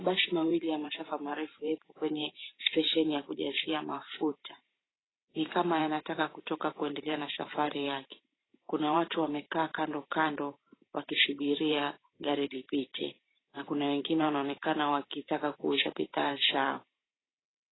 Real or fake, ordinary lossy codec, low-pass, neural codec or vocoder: fake; AAC, 16 kbps; 7.2 kHz; vocoder, 44.1 kHz, 128 mel bands every 512 samples, BigVGAN v2